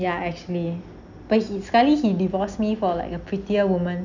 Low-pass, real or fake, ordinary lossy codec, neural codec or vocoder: 7.2 kHz; real; none; none